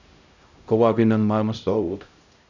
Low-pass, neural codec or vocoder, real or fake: 7.2 kHz; codec, 16 kHz, 0.5 kbps, X-Codec, HuBERT features, trained on LibriSpeech; fake